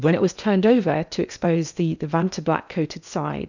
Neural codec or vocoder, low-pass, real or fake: codec, 16 kHz in and 24 kHz out, 0.8 kbps, FocalCodec, streaming, 65536 codes; 7.2 kHz; fake